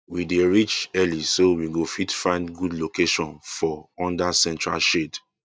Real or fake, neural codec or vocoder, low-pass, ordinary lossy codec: real; none; none; none